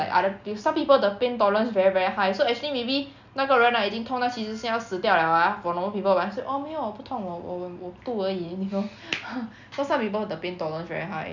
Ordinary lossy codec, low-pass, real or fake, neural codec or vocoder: none; 7.2 kHz; real; none